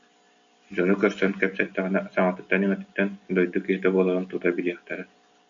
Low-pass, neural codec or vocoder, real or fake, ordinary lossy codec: 7.2 kHz; none; real; MP3, 64 kbps